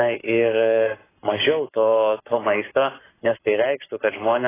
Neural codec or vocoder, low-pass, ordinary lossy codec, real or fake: codec, 44.1 kHz, 7.8 kbps, Pupu-Codec; 3.6 kHz; AAC, 16 kbps; fake